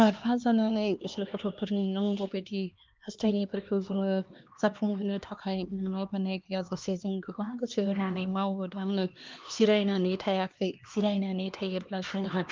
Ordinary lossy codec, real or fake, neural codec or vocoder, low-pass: Opus, 24 kbps; fake; codec, 16 kHz, 2 kbps, X-Codec, HuBERT features, trained on LibriSpeech; 7.2 kHz